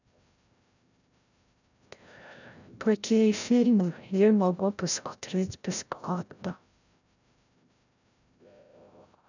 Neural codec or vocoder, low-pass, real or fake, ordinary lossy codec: codec, 16 kHz, 0.5 kbps, FreqCodec, larger model; 7.2 kHz; fake; none